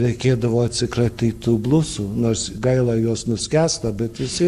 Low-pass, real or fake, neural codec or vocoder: 14.4 kHz; fake; codec, 44.1 kHz, 7.8 kbps, Pupu-Codec